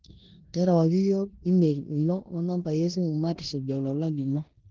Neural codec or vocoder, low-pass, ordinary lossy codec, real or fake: codec, 16 kHz in and 24 kHz out, 0.9 kbps, LongCat-Audio-Codec, four codebook decoder; 7.2 kHz; Opus, 16 kbps; fake